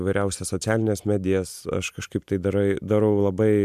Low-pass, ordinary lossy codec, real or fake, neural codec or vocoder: 14.4 kHz; MP3, 96 kbps; real; none